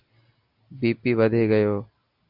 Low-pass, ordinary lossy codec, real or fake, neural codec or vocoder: 5.4 kHz; AAC, 48 kbps; real; none